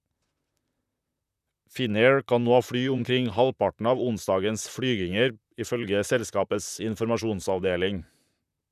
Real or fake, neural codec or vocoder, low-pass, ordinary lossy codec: fake; vocoder, 44.1 kHz, 128 mel bands, Pupu-Vocoder; 14.4 kHz; none